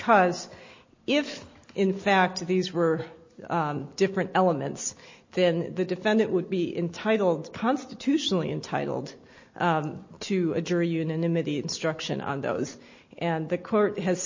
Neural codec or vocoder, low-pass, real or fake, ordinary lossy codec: none; 7.2 kHz; real; MP3, 32 kbps